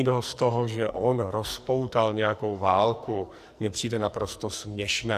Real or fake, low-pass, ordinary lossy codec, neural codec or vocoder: fake; 14.4 kHz; Opus, 64 kbps; codec, 44.1 kHz, 2.6 kbps, SNAC